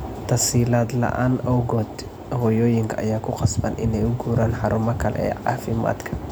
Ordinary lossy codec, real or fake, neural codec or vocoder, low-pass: none; real; none; none